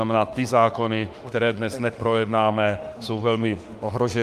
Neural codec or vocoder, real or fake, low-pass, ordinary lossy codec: autoencoder, 48 kHz, 32 numbers a frame, DAC-VAE, trained on Japanese speech; fake; 14.4 kHz; Opus, 32 kbps